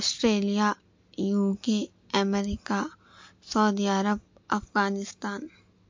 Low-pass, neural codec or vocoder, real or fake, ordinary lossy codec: 7.2 kHz; none; real; MP3, 48 kbps